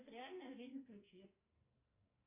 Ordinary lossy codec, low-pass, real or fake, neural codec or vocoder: MP3, 24 kbps; 3.6 kHz; fake; codec, 44.1 kHz, 2.6 kbps, SNAC